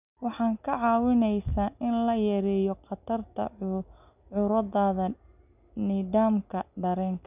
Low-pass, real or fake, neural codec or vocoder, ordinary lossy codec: 3.6 kHz; real; none; none